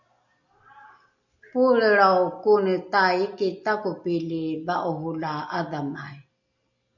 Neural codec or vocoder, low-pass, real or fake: none; 7.2 kHz; real